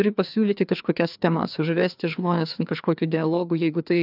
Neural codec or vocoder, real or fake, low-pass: codec, 16 kHz, 2 kbps, FreqCodec, larger model; fake; 5.4 kHz